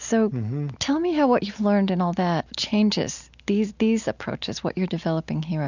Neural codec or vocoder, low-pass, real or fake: none; 7.2 kHz; real